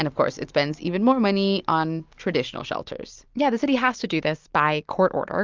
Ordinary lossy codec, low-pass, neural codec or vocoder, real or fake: Opus, 32 kbps; 7.2 kHz; none; real